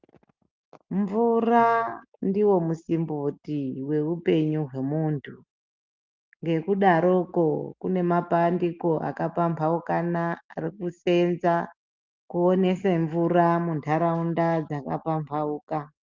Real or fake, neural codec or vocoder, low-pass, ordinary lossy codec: real; none; 7.2 kHz; Opus, 24 kbps